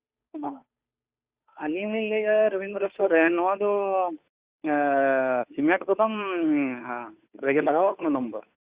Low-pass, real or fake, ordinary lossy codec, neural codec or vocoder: 3.6 kHz; fake; Opus, 64 kbps; codec, 16 kHz, 2 kbps, FunCodec, trained on Chinese and English, 25 frames a second